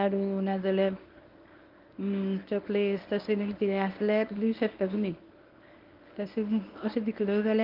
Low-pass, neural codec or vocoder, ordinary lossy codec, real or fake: 5.4 kHz; codec, 24 kHz, 0.9 kbps, WavTokenizer, medium speech release version 1; Opus, 24 kbps; fake